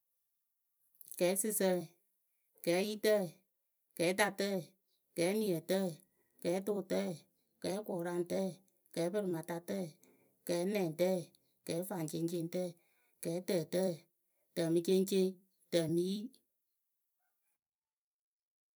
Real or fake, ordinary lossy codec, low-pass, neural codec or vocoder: fake; none; none; vocoder, 44.1 kHz, 128 mel bands every 256 samples, BigVGAN v2